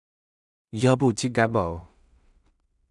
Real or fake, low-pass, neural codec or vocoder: fake; 10.8 kHz; codec, 16 kHz in and 24 kHz out, 0.4 kbps, LongCat-Audio-Codec, two codebook decoder